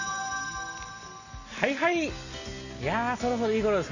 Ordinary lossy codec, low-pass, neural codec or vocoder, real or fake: none; 7.2 kHz; none; real